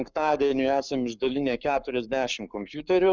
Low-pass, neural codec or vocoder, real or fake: 7.2 kHz; codec, 44.1 kHz, 7.8 kbps, Pupu-Codec; fake